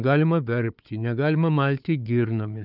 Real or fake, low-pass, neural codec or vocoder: fake; 5.4 kHz; codec, 16 kHz, 4 kbps, FunCodec, trained on Chinese and English, 50 frames a second